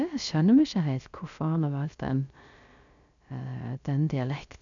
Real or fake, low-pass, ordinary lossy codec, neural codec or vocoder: fake; 7.2 kHz; none; codec, 16 kHz, 0.3 kbps, FocalCodec